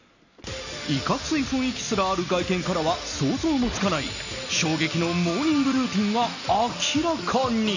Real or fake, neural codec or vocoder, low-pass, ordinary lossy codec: real; none; 7.2 kHz; none